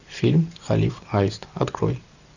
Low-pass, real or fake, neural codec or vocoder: 7.2 kHz; real; none